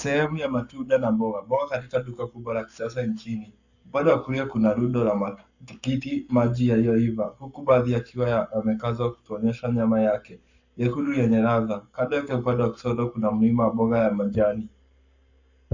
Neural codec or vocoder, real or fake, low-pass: codec, 44.1 kHz, 7.8 kbps, Pupu-Codec; fake; 7.2 kHz